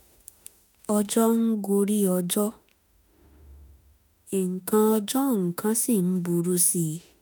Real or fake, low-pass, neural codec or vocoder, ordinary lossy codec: fake; none; autoencoder, 48 kHz, 32 numbers a frame, DAC-VAE, trained on Japanese speech; none